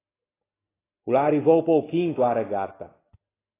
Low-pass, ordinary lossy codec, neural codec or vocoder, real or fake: 3.6 kHz; AAC, 16 kbps; none; real